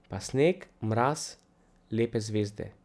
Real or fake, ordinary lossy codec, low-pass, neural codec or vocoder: real; none; none; none